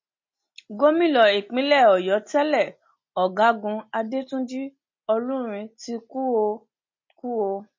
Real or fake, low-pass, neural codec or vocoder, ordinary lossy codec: real; 7.2 kHz; none; MP3, 32 kbps